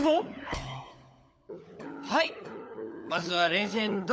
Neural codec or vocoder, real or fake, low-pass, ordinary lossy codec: codec, 16 kHz, 16 kbps, FunCodec, trained on LibriTTS, 50 frames a second; fake; none; none